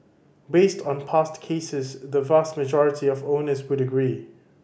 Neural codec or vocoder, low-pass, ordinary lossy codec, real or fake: none; none; none; real